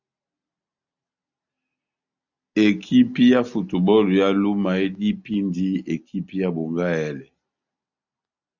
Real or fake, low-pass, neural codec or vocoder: real; 7.2 kHz; none